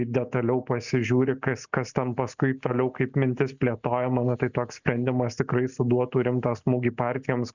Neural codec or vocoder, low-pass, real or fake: none; 7.2 kHz; real